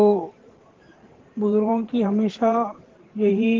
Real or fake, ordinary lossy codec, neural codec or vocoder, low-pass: fake; Opus, 16 kbps; vocoder, 44.1 kHz, 128 mel bands every 512 samples, BigVGAN v2; 7.2 kHz